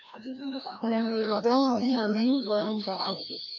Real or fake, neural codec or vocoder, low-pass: fake; codec, 16 kHz, 1 kbps, FreqCodec, larger model; 7.2 kHz